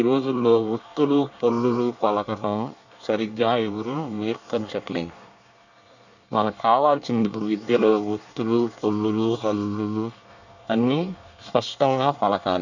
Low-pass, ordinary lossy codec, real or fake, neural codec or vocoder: 7.2 kHz; none; fake; codec, 24 kHz, 1 kbps, SNAC